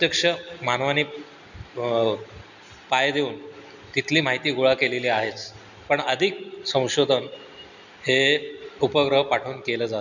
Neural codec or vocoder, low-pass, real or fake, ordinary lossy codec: none; 7.2 kHz; real; none